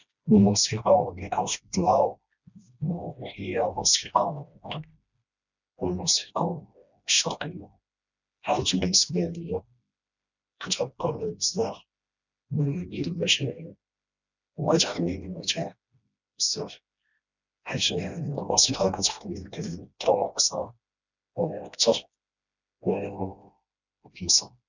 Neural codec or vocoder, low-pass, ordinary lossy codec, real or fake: codec, 16 kHz, 1 kbps, FreqCodec, smaller model; 7.2 kHz; none; fake